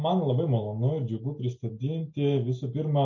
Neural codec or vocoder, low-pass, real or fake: none; 7.2 kHz; real